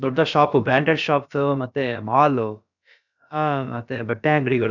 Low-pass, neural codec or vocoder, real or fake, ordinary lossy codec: 7.2 kHz; codec, 16 kHz, about 1 kbps, DyCAST, with the encoder's durations; fake; none